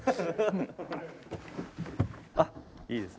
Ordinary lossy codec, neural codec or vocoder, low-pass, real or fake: none; none; none; real